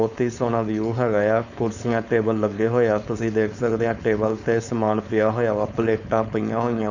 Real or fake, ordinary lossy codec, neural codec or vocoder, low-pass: fake; none; codec, 16 kHz, 4.8 kbps, FACodec; 7.2 kHz